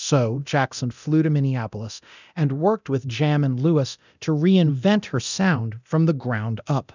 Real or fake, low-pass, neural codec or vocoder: fake; 7.2 kHz; codec, 24 kHz, 0.9 kbps, DualCodec